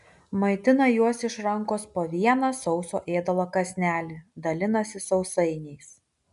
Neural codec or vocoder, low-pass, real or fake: none; 10.8 kHz; real